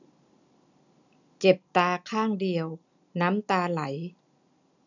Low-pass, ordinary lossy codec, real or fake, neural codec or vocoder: 7.2 kHz; none; real; none